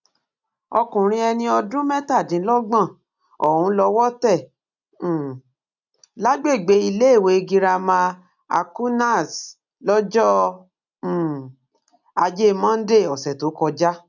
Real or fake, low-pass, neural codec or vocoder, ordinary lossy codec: real; 7.2 kHz; none; none